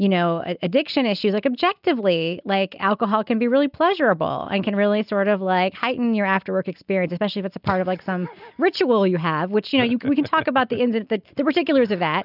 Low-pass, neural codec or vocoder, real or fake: 5.4 kHz; none; real